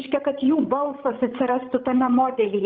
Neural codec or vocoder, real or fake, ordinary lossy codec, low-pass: codec, 16 kHz, 16 kbps, FreqCodec, larger model; fake; Opus, 24 kbps; 7.2 kHz